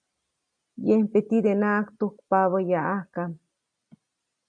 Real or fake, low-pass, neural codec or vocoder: real; 9.9 kHz; none